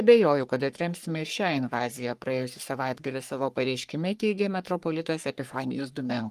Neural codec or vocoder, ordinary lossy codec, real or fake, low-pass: codec, 44.1 kHz, 3.4 kbps, Pupu-Codec; Opus, 32 kbps; fake; 14.4 kHz